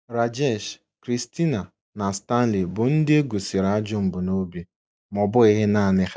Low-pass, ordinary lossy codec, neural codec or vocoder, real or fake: none; none; none; real